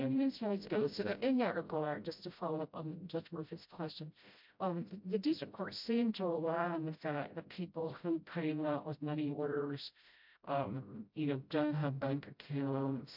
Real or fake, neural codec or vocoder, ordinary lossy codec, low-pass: fake; codec, 16 kHz, 0.5 kbps, FreqCodec, smaller model; MP3, 48 kbps; 5.4 kHz